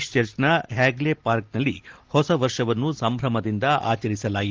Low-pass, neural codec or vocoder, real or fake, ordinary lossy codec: 7.2 kHz; none; real; Opus, 16 kbps